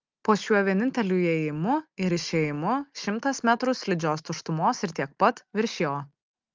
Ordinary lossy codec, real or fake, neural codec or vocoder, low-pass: Opus, 32 kbps; real; none; 7.2 kHz